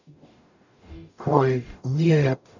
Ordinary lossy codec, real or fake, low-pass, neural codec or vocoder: none; fake; 7.2 kHz; codec, 44.1 kHz, 0.9 kbps, DAC